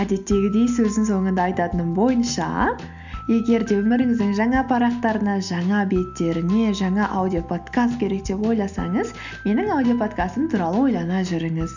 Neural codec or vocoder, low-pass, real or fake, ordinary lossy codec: none; 7.2 kHz; real; none